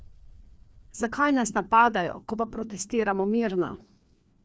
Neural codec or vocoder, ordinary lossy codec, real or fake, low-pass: codec, 16 kHz, 2 kbps, FreqCodec, larger model; none; fake; none